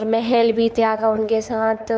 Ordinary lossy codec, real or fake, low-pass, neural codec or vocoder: none; fake; none; codec, 16 kHz, 4 kbps, X-Codec, WavLM features, trained on Multilingual LibriSpeech